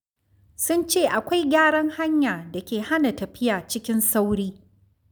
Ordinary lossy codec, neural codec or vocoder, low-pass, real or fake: none; none; none; real